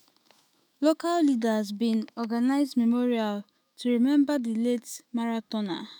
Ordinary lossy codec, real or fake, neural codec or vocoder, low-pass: none; fake; autoencoder, 48 kHz, 128 numbers a frame, DAC-VAE, trained on Japanese speech; none